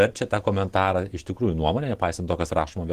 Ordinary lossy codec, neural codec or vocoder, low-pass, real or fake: Opus, 16 kbps; none; 14.4 kHz; real